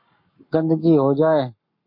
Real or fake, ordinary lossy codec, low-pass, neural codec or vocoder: fake; MP3, 32 kbps; 5.4 kHz; codec, 44.1 kHz, 7.8 kbps, Pupu-Codec